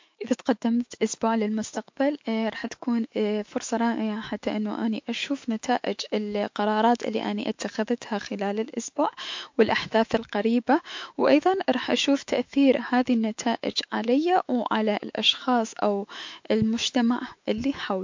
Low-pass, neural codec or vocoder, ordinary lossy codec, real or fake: 7.2 kHz; autoencoder, 48 kHz, 128 numbers a frame, DAC-VAE, trained on Japanese speech; MP3, 48 kbps; fake